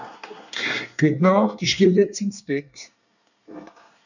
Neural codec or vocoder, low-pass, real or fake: codec, 24 kHz, 1 kbps, SNAC; 7.2 kHz; fake